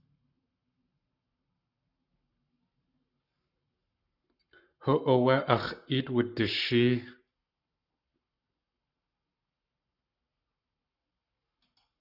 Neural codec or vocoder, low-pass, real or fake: codec, 44.1 kHz, 7.8 kbps, Pupu-Codec; 5.4 kHz; fake